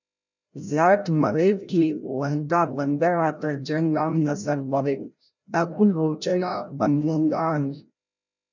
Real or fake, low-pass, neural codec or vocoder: fake; 7.2 kHz; codec, 16 kHz, 0.5 kbps, FreqCodec, larger model